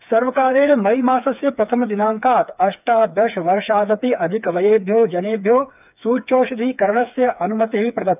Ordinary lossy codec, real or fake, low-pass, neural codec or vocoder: none; fake; 3.6 kHz; codec, 16 kHz, 4 kbps, FreqCodec, smaller model